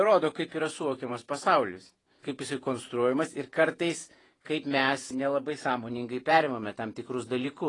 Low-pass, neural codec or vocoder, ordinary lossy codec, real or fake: 10.8 kHz; vocoder, 48 kHz, 128 mel bands, Vocos; AAC, 32 kbps; fake